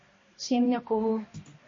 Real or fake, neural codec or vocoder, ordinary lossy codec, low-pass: fake; codec, 16 kHz, 1 kbps, X-Codec, HuBERT features, trained on balanced general audio; MP3, 32 kbps; 7.2 kHz